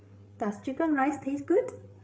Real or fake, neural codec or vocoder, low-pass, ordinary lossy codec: fake; codec, 16 kHz, 8 kbps, FreqCodec, larger model; none; none